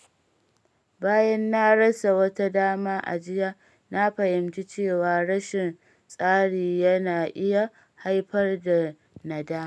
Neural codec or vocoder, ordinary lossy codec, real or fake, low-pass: none; none; real; none